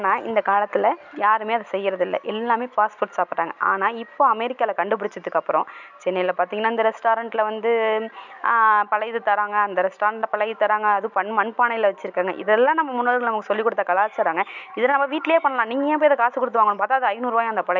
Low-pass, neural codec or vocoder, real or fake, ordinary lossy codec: 7.2 kHz; none; real; none